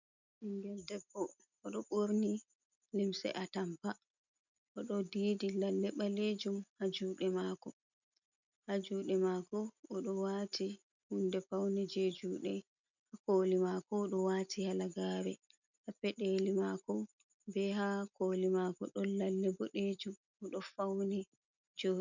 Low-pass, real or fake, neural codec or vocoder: 7.2 kHz; real; none